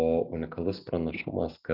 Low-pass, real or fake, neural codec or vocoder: 5.4 kHz; real; none